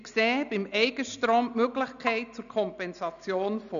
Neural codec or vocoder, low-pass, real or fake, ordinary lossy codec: none; 7.2 kHz; real; none